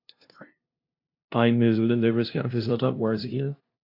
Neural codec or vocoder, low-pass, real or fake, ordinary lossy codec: codec, 16 kHz, 0.5 kbps, FunCodec, trained on LibriTTS, 25 frames a second; 5.4 kHz; fake; AAC, 32 kbps